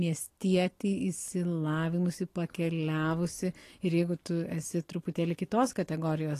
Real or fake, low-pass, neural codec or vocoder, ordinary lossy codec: real; 14.4 kHz; none; AAC, 48 kbps